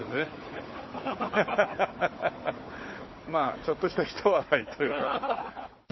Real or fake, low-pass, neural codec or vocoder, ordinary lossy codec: fake; 7.2 kHz; codec, 16 kHz, 4 kbps, FunCodec, trained on Chinese and English, 50 frames a second; MP3, 24 kbps